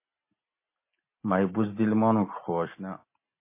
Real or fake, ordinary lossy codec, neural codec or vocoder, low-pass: real; MP3, 24 kbps; none; 3.6 kHz